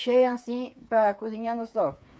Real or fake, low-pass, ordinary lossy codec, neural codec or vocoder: fake; none; none; codec, 16 kHz, 8 kbps, FreqCodec, smaller model